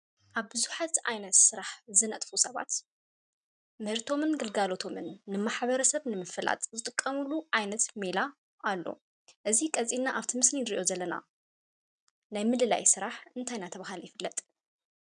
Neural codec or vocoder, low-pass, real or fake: none; 9.9 kHz; real